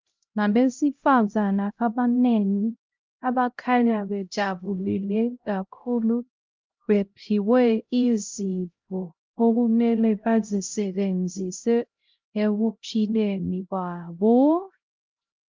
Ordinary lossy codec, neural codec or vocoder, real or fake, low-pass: Opus, 32 kbps; codec, 16 kHz, 0.5 kbps, X-Codec, HuBERT features, trained on LibriSpeech; fake; 7.2 kHz